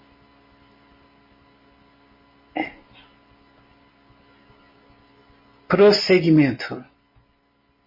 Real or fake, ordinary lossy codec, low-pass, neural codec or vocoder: real; MP3, 24 kbps; 5.4 kHz; none